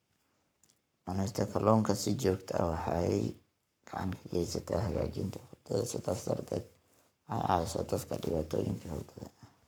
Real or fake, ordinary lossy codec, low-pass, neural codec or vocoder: fake; none; none; codec, 44.1 kHz, 7.8 kbps, Pupu-Codec